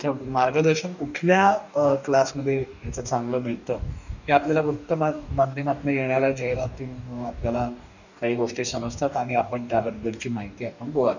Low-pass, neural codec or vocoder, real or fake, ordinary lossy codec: 7.2 kHz; codec, 44.1 kHz, 2.6 kbps, DAC; fake; none